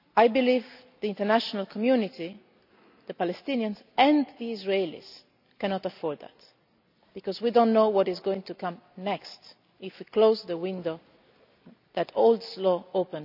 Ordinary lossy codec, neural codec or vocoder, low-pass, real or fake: none; none; 5.4 kHz; real